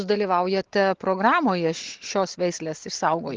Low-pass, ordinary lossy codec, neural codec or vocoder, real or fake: 7.2 kHz; Opus, 16 kbps; none; real